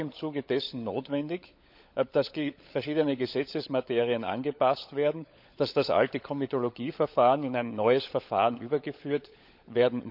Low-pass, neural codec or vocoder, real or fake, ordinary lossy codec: 5.4 kHz; codec, 16 kHz, 16 kbps, FunCodec, trained on LibriTTS, 50 frames a second; fake; none